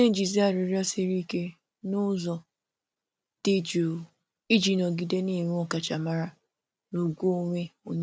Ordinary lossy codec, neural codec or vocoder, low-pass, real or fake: none; none; none; real